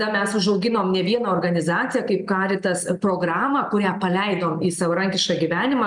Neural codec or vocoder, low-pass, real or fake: none; 10.8 kHz; real